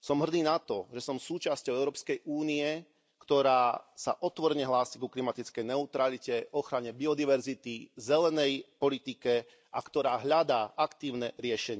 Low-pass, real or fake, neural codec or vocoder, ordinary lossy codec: none; real; none; none